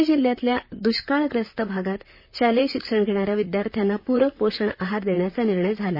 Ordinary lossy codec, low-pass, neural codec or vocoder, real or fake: MP3, 24 kbps; 5.4 kHz; vocoder, 44.1 kHz, 128 mel bands, Pupu-Vocoder; fake